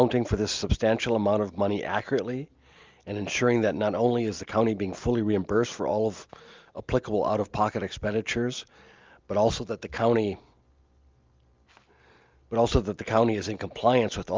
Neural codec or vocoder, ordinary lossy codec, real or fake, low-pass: none; Opus, 24 kbps; real; 7.2 kHz